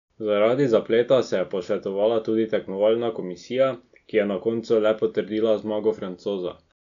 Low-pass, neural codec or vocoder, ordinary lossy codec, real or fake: 7.2 kHz; none; none; real